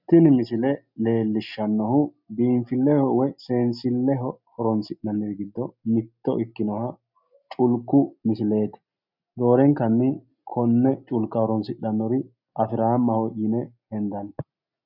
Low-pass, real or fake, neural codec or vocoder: 5.4 kHz; real; none